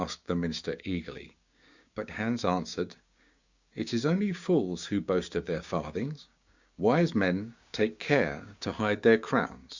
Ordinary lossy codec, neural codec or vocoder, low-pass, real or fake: Opus, 64 kbps; codec, 16 kHz, 6 kbps, DAC; 7.2 kHz; fake